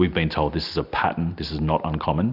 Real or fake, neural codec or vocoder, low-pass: real; none; 5.4 kHz